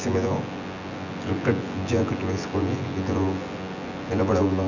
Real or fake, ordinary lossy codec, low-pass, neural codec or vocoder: fake; none; 7.2 kHz; vocoder, 24 kHz, 100 mel bands, Vocos